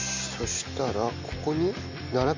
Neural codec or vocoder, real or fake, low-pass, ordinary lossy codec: none; real; 7.2 kHz; MP3, 48 kbps